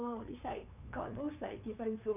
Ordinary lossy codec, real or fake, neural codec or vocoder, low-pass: none; fake; codec, 16 kHz, 2 kbps, FunCodec, trained on LibriTTS, 25 frames a second; 3.6 kHz